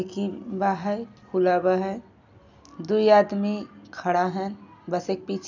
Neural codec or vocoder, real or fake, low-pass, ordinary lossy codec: none; real; 7.2 kHz; none